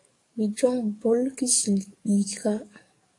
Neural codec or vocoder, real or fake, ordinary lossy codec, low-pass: codec, 44.1 kHz, 7.8 kbps, DAC; fake; AAC, 48 kbps; 10.8 kHz